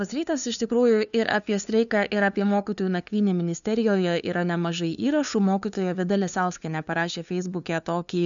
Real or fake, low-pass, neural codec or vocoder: fake; 7.2 kHz; codec, 16 kHz, 2 kbps, FunCodec, trained on LibriTTS, 25 frames a second